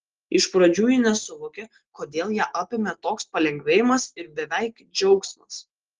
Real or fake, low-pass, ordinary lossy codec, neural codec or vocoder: real; 7.2 kHz; Opus, 16 kbps; none